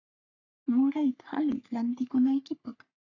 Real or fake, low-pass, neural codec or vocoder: fake; 7.2 kHz; codec, 44.1 kHz, 3.4 kbps, Pupu-Codec